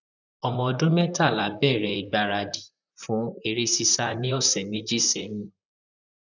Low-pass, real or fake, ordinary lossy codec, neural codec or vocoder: 7.2 kHz; fake; none; vocoder, 44.1 kHz, 128 mel bands, Pupu-Vocoder